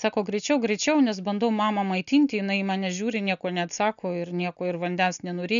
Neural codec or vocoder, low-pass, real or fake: none; 7.2 kHz; real